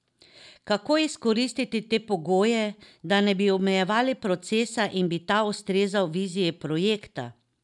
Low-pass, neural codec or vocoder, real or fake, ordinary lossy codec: 10.8 kHz; none; real; none